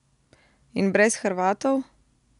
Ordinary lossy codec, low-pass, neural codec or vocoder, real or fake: none; 10.8 kHz; none; real